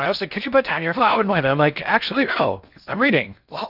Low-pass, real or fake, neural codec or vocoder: 5.4 kHz; fake; codec, 16 kHz in and 24 kHz out, 0.6 kbps, FocalCodec, streaming, 4096 codes